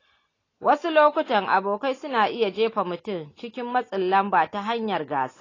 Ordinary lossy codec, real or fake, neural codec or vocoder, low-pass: AAC, 32 kbps; real; none; 7.2 kHz